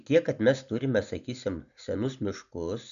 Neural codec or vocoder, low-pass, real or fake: none; 7.2 kHz; real